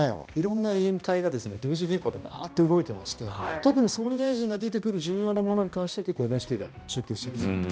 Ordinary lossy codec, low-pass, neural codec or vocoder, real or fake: none; none; codec, 16 kHz, 1 kbps, X-Codec, HuBERT features, trained on balanced general audio; fake